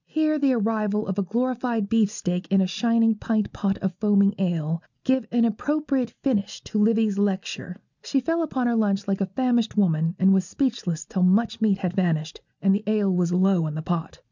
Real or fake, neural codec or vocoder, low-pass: real; none; 7.2 kHz